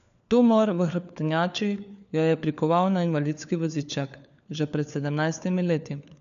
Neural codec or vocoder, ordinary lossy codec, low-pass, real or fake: codec, 16 kHz, 4 kbps, FunCodec, trained on LibriTTS, 50 frames a second; none; 7.2 kHz; fake